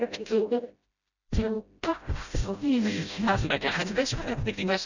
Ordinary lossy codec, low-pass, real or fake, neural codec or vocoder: none; 7.2 kHz; fake; codec, 16 kHz, 0.5 kbps, FreqCodec, smaller model